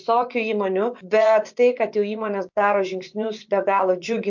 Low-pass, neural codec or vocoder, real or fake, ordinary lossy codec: 7.2 kHz; vocoder, 24 kHz, 100 mel bands, Vocos; fake; MP3, 48 kbps